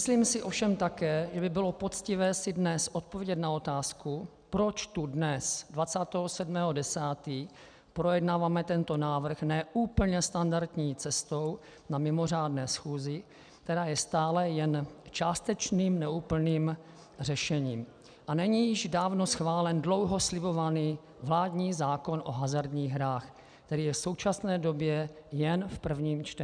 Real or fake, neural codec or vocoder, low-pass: real; none; 9.9 kHz